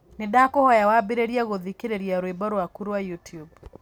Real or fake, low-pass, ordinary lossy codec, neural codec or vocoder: real; none; none; none